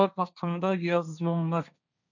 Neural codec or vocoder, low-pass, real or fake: codec, 16 kHz, 1.1 kbps, Voila-Tokenizer; 7.2 kHz; fake